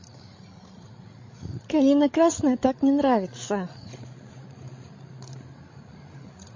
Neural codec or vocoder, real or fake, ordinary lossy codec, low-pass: codec, 16 kHz, 16 kbps, FreqCodec, larger model; fake; MP3, 32 kbps; 7.2 kHz